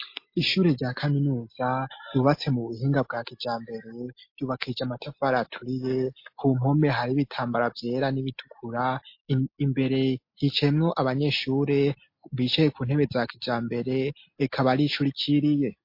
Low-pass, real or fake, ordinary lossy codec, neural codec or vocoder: 5.4 kHz; real; MP3, 32 kbps; none